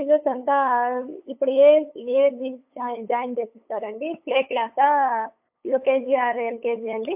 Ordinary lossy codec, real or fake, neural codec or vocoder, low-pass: MP3, 32 kbps; fake; codec, 16 kHz, 8 kbps, FunCodec, trained on LibriTTS, 25 frames a second; 3.6 kHz